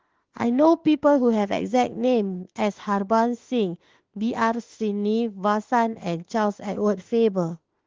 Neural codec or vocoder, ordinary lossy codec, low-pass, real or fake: autoencoder, 48 kHz, 32 numbers a frame, DAC-VAE, trained on Japanese speech; Opus, 16 kbps; 7.2 kHz; fake